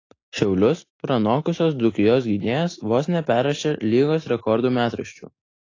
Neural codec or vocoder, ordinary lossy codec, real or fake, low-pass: none; AAC, 32 kbps; real; 7.2 kHz